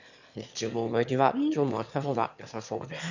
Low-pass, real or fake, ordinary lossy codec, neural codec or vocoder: 7.2 kHz; fake; none; autoencoder, 22.05 kHz, a latent of 192 numbers a frame, VITS, trained on one speaker